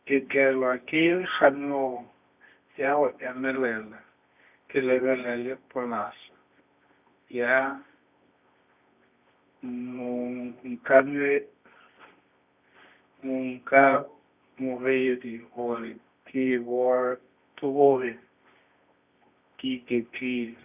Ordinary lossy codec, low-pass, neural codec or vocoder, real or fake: none; 3.6 kHz; codec, 24 kHz, 0.9 kbps, WavTokenizer, medium music audio release; fake